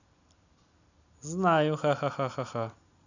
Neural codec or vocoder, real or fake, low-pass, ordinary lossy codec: none; real; 7.2 kHz; none